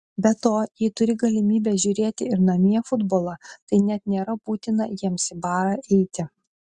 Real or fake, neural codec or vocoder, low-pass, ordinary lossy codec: real; none; 10.8 kHz; Opus, 64 kbps